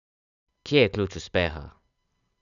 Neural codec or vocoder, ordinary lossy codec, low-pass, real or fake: none; none; 7.2 kHz; real